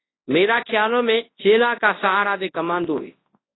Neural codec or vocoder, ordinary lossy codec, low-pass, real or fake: codec, 24 kHz, 0.9 kbps, WavTokenizer, large speech release; AAC, 16 kbps; 7.2 kHz; fake